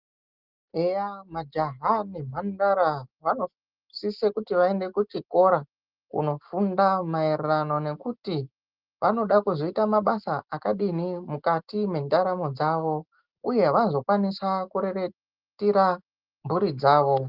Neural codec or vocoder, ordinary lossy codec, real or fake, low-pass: none; Opus, 32 kbps; real; 5.4 kHz